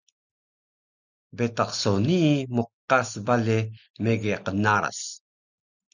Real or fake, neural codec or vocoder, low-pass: real; none; 7.2 kHz